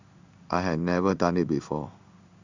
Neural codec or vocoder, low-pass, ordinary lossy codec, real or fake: codec, 16 kHz in and 24 kHz out, 1 kbps, XY-Tokenizer; 7.2 kHz; Opus, 64 kbps; fake